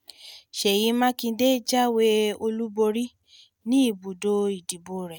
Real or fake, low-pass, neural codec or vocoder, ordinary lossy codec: real; none; none; none